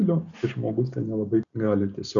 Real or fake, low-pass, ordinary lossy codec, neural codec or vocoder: real; 7.2 kHz; MP3, 96 kbps; none